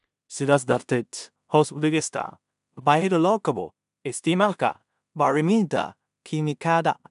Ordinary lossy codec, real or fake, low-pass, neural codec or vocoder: none; fake; 10.8 kHz; codec, 16 kHz in and 24 kHz out, 0.4 kbps, LongCat-Audio-Codec, two codebook decoder